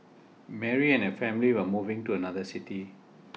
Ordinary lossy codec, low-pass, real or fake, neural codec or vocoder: none; none; real; none